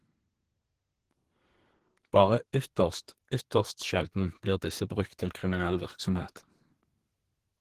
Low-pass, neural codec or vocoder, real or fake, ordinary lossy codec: 14.4 kHz; codec, 32 kHz, 1.9 kbps, SNAC; fake; Opus, 16 kbps